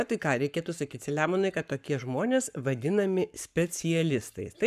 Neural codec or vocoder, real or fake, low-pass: codec, 44.1 kHz, 7.8 kbps, Pupu-Codec; fake; 14.4 kHz